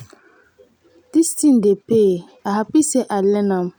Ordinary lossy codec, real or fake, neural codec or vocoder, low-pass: none; real; none; none